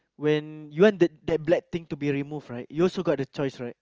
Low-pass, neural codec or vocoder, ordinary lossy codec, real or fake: 7.2 kHz; none; Opus, 24 kbps; real